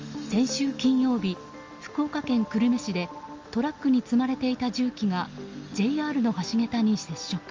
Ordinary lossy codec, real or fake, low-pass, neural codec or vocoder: Opus, 32 kbps; real; 7.2 kHz; none